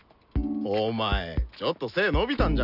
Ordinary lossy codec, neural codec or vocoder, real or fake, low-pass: none; none; real; 5.4 kHz